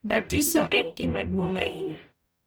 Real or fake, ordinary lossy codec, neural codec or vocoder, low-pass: fake; none; codec, 44.1 kHz, 0.9 kbps, DAC; none